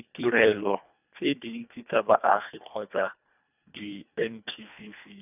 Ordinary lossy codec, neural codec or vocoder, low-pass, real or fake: none; codec, 24 kHz, 1.5 kbps, HILCodec; 3.6 kHz; fake